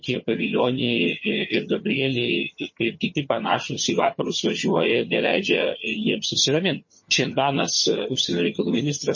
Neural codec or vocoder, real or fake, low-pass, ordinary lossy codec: vocoder, 22.05 kHz, 80 mel bands, HiFi-GAN; fake; 7.2 kHz; MP3, 32 kbps